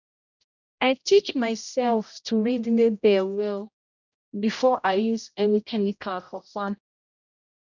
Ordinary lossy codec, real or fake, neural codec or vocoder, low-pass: none; fake; codec, 16 kHz, 0.5 kbps, X-Codec, HuBERT features, trained on general audio; 7.2 kHz